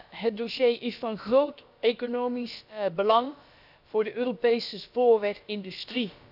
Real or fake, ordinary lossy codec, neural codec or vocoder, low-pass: fake; none; codec, 16 kHz, about 1 kbps, DyCAST, with the encoder's durations; 5.4 kHz